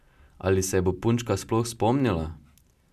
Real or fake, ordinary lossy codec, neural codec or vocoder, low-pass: real; none; none; 14.4 kHz